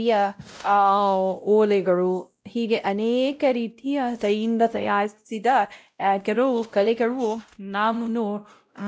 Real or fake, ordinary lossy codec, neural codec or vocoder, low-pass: fake; none; codec, 16 kHz, 0.5 kbps, X-Codec, WavLM features, trained on Multilingual LibriSpeech; none